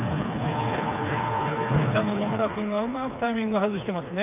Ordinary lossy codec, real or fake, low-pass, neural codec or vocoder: none; fake; 3.6 kHz; codec, 16 kHz, 4 kbps, FreqCodec, smaller model